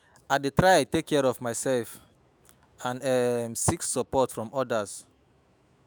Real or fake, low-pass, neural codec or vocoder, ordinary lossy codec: fake; none; autoencoder, 48 kHz, 128 numbers a frame, DAC-VAE, trained on Japanese speech; none